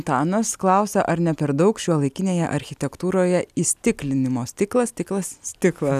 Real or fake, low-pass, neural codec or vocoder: real; 14.4 kHz; none